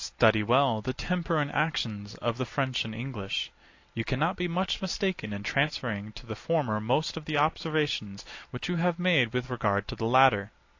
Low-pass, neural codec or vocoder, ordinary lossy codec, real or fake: 7.2 kHz; none; AAC, 48 kbps; real